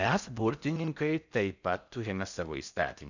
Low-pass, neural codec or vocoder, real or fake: 7.2 kHz; codec, 16 kHz in and 24 kHz out, 0.6 kbps, FocalCodec, streaming, 4096 codes; fake